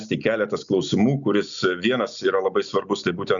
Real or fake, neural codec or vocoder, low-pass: real; none; 7.2 kHz